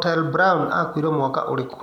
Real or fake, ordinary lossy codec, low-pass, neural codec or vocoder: real; none; 19.8 kHz; none